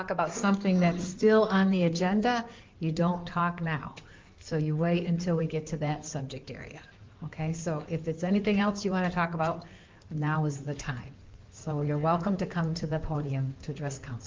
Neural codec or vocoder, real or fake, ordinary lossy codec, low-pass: codec, 16 kHz in and 24 kHz out, 2.2 kbps, FireRedTTS-2 codec; fake; Opus, 32 kbps; 7.2 kHz